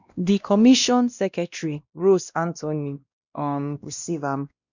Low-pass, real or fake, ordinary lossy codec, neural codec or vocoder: 7.2 kHz; fake; none; codec, 16 kHz, 1 kbps, X-Codec, WavLM features, trained on Multilingual LibriSpeech